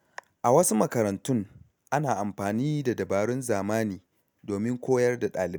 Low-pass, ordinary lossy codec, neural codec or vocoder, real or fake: none; none; none; real